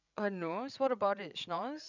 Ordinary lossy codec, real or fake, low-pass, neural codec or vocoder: none; fake; 7.2 kHz; codec, 16 kHz, 16 kbps, FreqCodec, larger model